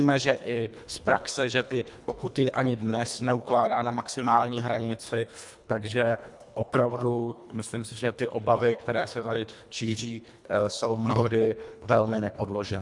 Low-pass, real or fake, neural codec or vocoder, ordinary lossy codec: 10.8 kHz; fake; codec, 24 kHz, 1.5 kbps, HILCodec; MP3, 96 kbps